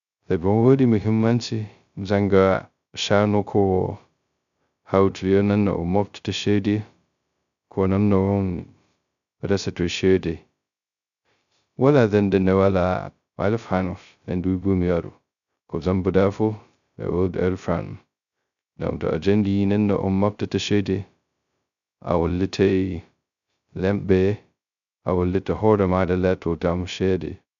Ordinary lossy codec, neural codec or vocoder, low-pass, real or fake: none; codec, 16 kHz, 0.2 kbps, FocalCodec; 7.2 kHz; fake